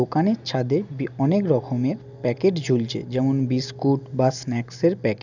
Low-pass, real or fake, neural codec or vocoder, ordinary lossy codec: 7.2 kHz; real; none; none